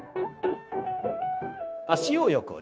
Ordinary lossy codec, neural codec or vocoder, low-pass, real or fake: none; codec, 16 kHz, 0.9 kbps, LongCat-Audio-Codec; none; fake